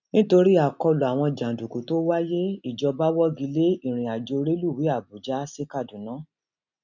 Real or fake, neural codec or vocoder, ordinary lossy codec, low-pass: real; none; none; 7.2 kHz